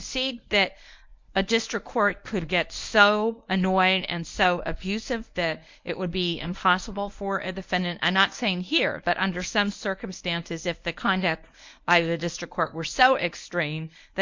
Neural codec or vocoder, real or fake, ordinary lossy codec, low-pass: codec, 24 kHz, 0.9 kbps, WavTokenizer, medium speech release version 1; fake; MP3, 64 kbps; 7.2 kHz